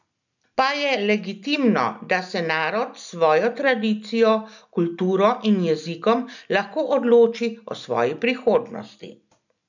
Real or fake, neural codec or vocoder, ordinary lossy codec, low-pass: real; none; none; 7.2 kHz